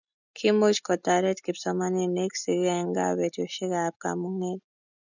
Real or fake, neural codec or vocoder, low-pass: real; none; 7.2 kHz